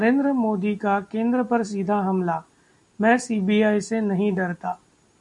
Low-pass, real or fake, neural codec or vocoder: 10.8 kHz; real; none